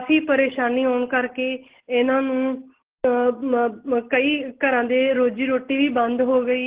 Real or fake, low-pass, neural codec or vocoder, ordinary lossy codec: real; 3.6 kHz; none; Opus, 16 kbps